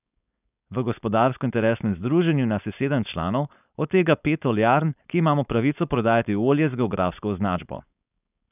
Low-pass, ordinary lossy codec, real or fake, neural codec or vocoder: 3.6 kHz; none; fake; codec, 16 kHz, 4.8 kbps, FACodec